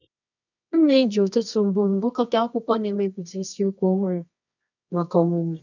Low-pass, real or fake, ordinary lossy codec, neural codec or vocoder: 7.2 kHz; fake; none; codec, 24 kHz, 0.9 kbps, WavTokenizer, medium music audio release